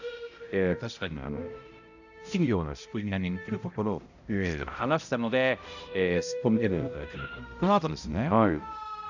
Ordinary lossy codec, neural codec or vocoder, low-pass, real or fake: none; codec, 16 kHz, 0.5 kbps, X-Codec, HuBERT features, trained on balanced general audio; 7.2 kHz; fake